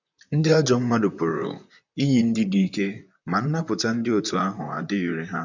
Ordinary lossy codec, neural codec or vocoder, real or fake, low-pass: none; vocoder, 44.1 kHz, 128 mel bands, Pupu-Vocoder; fake; 7.2 kHz